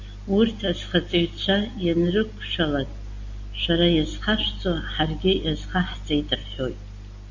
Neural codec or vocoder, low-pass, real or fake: none; 7.2 kHz; real